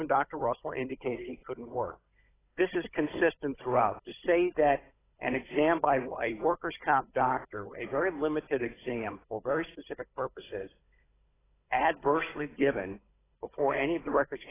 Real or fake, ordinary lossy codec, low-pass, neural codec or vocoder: fake; AAC, 16 kbps; 3.6 kHz; codec, 16 kHz, 16 kbps, FunCodec, trained on Chinese and English, 50 frames a second